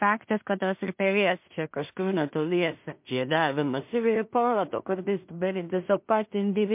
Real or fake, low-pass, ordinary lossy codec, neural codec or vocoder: fake; 3.6 kHz; MP3, 32 kbps; codec, 16 kHz in and 24 kHz out, 0.4 kbps, LongCat-Audio-Codec, two codebook decoder